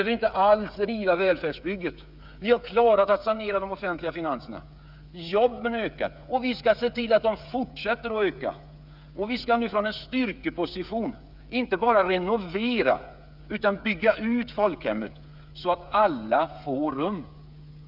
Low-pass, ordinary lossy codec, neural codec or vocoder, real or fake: 5.4 kHz; none; codec, 16 kHz, 8 kbps, FreqCodec, smaller model; fake